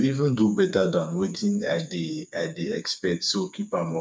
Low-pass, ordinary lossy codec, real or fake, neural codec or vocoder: none; none; fake; codec, 16 kHz, 4 kbps, FreqCodec, smaller model